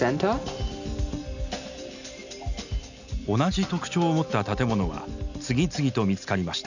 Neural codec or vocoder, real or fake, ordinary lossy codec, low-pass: none; real; none; 7.2 kHz